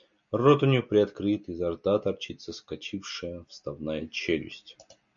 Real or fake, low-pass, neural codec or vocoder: real; 7.2 kHz; none